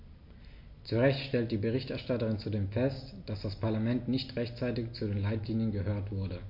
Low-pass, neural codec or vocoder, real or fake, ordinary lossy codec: 5.4 kHz; none; real; MP3, 32 kbps